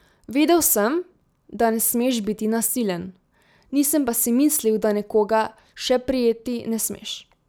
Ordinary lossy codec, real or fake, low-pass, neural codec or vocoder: none; real; none; none